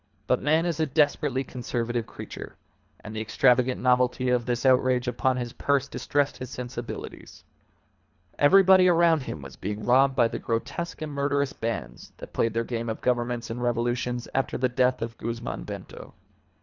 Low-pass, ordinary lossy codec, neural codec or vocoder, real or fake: 7.2 kHz; Opus, 64 kbps; codec, 24 kHz, 3 kbps, HILCodec; fake